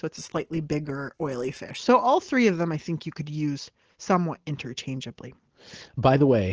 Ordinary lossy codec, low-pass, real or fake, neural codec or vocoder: Opus, 16 kbps; 7.2 kHz; real; none